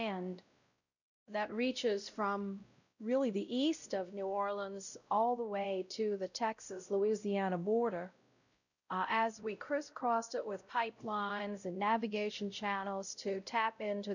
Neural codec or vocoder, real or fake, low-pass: codec, 16 kHz, 0.5 kbps, X-Codec, WavLM features, trained on Multilingual LibriSpeech; fake; 7.2 kHz